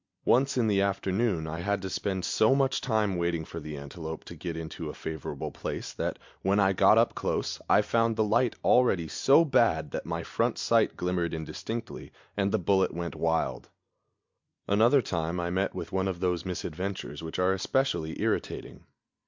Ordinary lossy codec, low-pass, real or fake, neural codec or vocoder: MP3, 64 kbps; 7.2 kHz; real; none